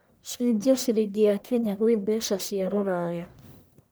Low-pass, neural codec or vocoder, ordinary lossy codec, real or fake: none; codec, 44.1 kHz, 1.7 kbps, Pupu-Codec; none; fake